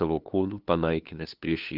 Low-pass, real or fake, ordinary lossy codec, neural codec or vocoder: 5.4 kHz; fake; Opus, 32 kbps; codec, 16 kHz, 2 kbps, FunCodec, trained on LibriTTS, 25 frames a second